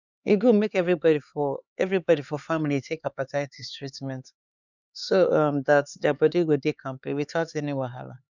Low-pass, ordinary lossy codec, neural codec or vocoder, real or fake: 7.2 kHz; none; codec, 16 kHz, 4 kbps, X-Codec, HuBERT features, trained on LibriSpeech; fake